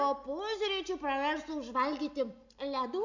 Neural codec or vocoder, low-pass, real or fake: none; 7.2 kHz; real